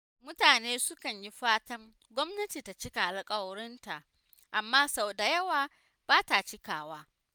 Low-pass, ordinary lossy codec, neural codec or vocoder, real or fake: none; none; none; real